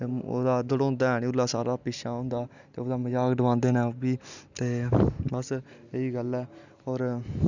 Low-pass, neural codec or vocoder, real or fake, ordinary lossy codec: 7.2 kHz; none; real; none